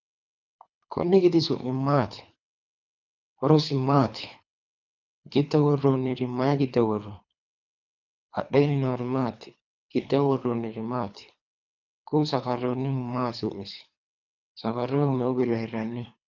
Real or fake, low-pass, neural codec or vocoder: fake; 7.2 kHz; codec, 24 kHz, 3 kbps, HILCodec